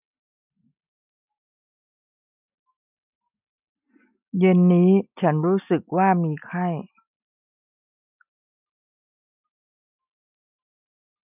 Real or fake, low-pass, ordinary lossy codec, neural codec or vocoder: real; 3.6 kHz; none; none